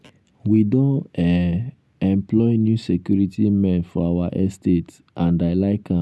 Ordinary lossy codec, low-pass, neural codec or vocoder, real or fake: none; none; none; real